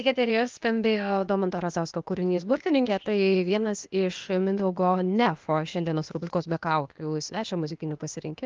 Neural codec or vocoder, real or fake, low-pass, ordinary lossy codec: codec, 16 kHz, 0.8 kbps, ZipCodec; fake; 7.2 kHz; Opus, 32 kbps